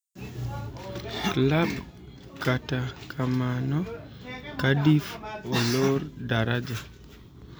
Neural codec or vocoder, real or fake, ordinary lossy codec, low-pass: none; real; none; none